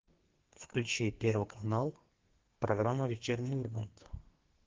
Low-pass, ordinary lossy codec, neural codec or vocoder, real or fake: 7.2 kHz; Opus, 16 kbps; codec, 32 kHz, 1.9 kbps, SNAC; fake